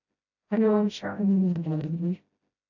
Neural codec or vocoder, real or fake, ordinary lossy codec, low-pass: codec, 16 kHz, 0.5 kbps, FreqCodec, smaller model; fake; AAC, 48 kbps; 7.2 kHz